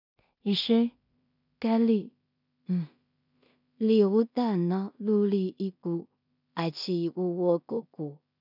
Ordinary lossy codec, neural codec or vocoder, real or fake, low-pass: none; codec, 16 kHz in and 24 kHz out, 0.4 kbps, LongCat-Audio-Codec, two codebook decoder; fake; 5.4 kHz